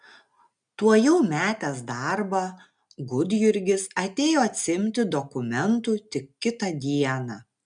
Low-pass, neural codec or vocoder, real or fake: 9.9 kHz; none; real